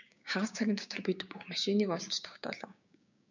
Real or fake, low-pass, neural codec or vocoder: fake; 7.2 kHz; codec, 16 kHz, 6 kbps, DAC